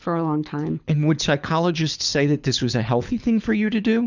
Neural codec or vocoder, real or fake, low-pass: codec, 24 kHz, 6 kbps, HILCodec; fake; 7.2 kHz